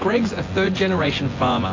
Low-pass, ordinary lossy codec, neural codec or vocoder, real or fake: 7.2 kHz; AAC, 32 kbps; vocoder, 24 kHz, 100 mel bands, Vocos; fake